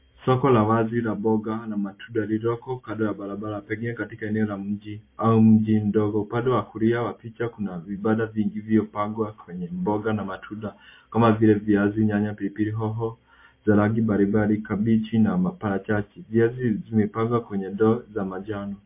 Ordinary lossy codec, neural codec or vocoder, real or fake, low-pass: MP3, 24 kbps; none; real; 3.6 kHz